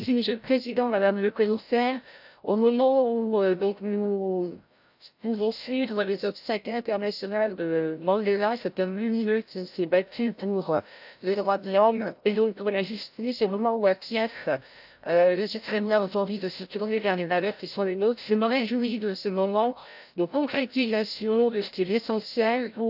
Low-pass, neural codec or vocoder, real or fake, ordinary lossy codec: 5.4 kHz; codec, 16 kHz, 0.5 kbps, FreqCodec, larger model; fake; AAC, 48 kbps